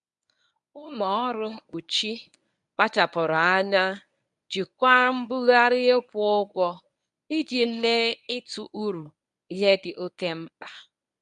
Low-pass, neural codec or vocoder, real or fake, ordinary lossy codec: 10.8 kHz; codec, 24 kHz, 0.9 kbps, WavTokenizer, medium speech release version 1; fake; none